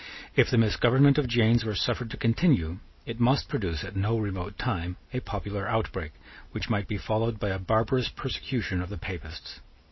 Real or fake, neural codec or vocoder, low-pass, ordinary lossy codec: real; none; 7.2 kHz; MP3, 24 kbps